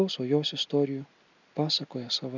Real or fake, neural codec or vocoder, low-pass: real; none; 7.2 kHz